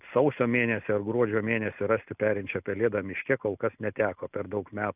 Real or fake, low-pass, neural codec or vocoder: real; 3.6 kHz; none